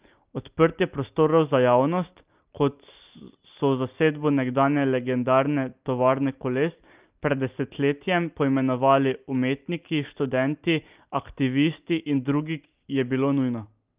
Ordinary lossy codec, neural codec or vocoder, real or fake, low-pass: Opus, 24 kbps; none; real; 3.6 kHz